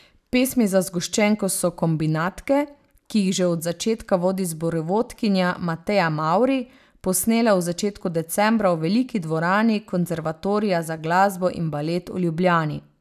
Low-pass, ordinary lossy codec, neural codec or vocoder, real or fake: 14.4 kHz; none; none; real